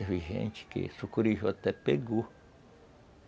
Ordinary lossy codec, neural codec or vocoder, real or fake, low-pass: none; none; real; none